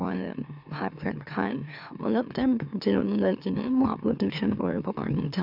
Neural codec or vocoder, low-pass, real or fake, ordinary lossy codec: autoencoder, 44.1 kHz, a latent of 192 numbers a frame, MeloTTS; 5.4 kHz; fake; none